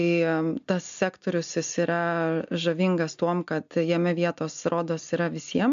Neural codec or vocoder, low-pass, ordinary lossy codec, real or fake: none; 7.2 kHz; MP3, 64 kbps; real